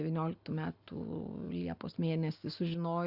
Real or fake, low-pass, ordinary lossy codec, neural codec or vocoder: real; 5.4 kHz; Opus, 64 kbps; none